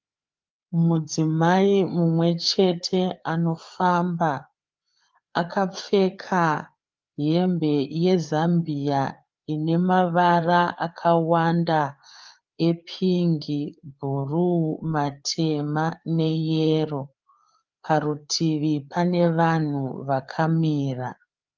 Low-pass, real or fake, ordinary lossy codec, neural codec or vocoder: 7.2 kHz; fake; Opus, 24 kbps; codec, 16 kHz, 4 kbps, FreqCodec, larger model